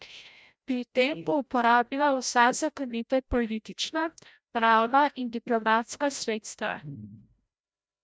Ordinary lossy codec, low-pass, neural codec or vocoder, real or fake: none; none; codec, 16 kHz, 0.5 kbps, FreqCodec, larger model; fake